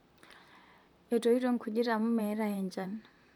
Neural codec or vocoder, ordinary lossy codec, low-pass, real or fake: vocoder, 44.1 kHz, 128 mel bands, Pupu-Vocoder; none; 19.8 kHz; fake